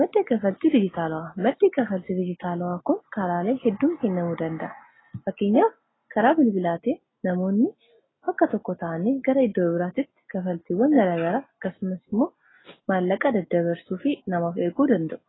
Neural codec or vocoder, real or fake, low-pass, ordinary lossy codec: none; real; 7.2 kHz; AAC, 16 kbps